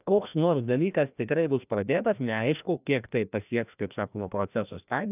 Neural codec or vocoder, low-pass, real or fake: codec, 16 kHz, 1 kbps, FreqCodec, larger model; 3.6 kHz; fake